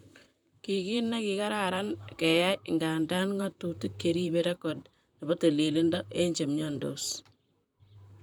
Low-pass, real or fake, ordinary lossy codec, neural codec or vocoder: 19.8 kHz; fake; none; vocoder, 44.1 kHz, 128 mel bands, Pupu-Vocoder